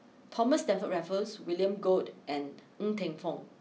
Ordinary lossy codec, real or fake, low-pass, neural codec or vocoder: none; real; none; none